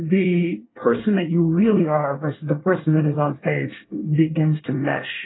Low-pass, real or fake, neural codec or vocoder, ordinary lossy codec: 7.2 kHz; fake; codec, 24 kHz, 1 kbps, SNAC; AAC, 16 kbps